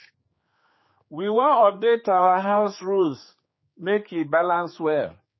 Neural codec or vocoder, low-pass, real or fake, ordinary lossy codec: codec, 16 kHz, 4 kbps, X-Codec, HuBERT features, trained on general audio; 7.2 kHz; fake; MP3, 24 kbps